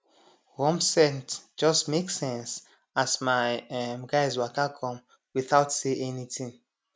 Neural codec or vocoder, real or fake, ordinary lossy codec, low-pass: none; real; none; none